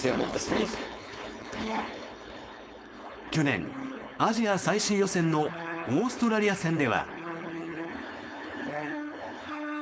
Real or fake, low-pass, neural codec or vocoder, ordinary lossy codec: fake; none; codec, 16 kHz, 4.8 kbps, FACodec; none